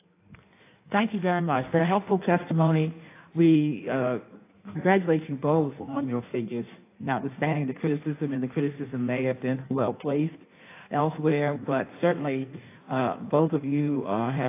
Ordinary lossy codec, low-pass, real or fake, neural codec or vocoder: AAC, 24 kbps; 3.6 kHz; fake; codec, 16 kHz in and 24 kHz out, 1.1 kbps, FireRedTTS-2 codec